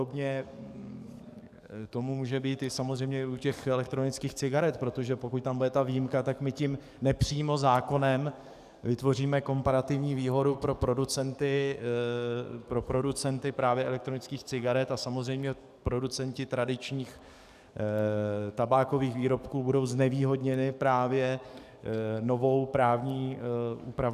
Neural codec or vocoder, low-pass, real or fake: codec, 44.1 kHz, 7.8 kbps, DAC; 14.4 kHz; fake